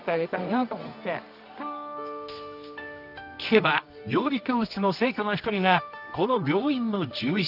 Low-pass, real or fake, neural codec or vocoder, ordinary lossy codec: 5.4 kHz; fake; codec, 24 kHz, 0.9 kbps, WavTokenizer, medium music audio release; none